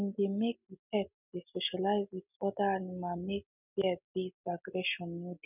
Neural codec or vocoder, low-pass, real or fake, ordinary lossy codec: none; 3.6 kHz; real; none